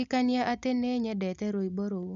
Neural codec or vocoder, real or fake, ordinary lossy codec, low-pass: none; real; none; 7.2 kHz